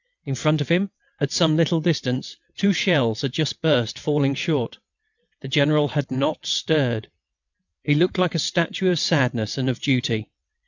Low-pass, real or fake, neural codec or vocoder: 7.2 kHz; fake; vocoder, 22.05 kHz, 80 mel bands, WaveNeXt